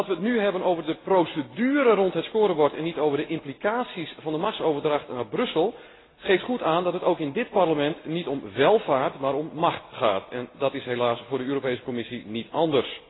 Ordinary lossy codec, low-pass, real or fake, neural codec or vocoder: AAC, 16 kbps; 7.2 kHz; real; none